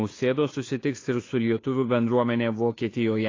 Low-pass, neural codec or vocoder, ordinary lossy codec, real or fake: 7.2 kHz; codec, 16 kHz, 2 kbps, FunCodec, trained on Chinese and English, 25 frames a second; AAC, 32 kbps; fake